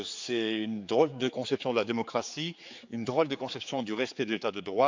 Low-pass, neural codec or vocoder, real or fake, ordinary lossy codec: 7.2 kHz; codec, 16 kHz, 4 kbps, X-Codec, HuBERT features, trained on general audio; fake; MP3, 64 kbps